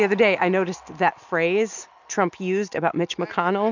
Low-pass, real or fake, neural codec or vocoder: 7.2 kHz; real; none